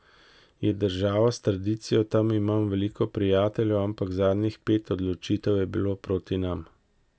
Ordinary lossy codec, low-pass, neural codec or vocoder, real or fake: none; none; none; real